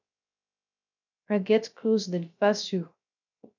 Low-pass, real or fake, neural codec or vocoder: 7.2 kHz; fake; codec, 16 kHz, 0.3 kbps, FocalCodec